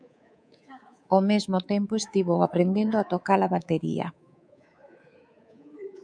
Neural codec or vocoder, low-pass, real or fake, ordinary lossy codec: codec, 24 kHz, 3.1 kbps, DualCodec; 9.9 kHz; fake; MP3, 96 kbps